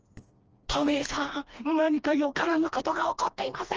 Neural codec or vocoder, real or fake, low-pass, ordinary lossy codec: codec, 16 kHz, 2 kbps, FreqCodec, smaller model; fake; 7.2 kHz; Opus, 24 kbps